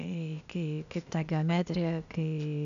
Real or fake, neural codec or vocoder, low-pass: fake; codec, 16 kHz, 0.8 kbps, ZipCodec; 7.2 kHz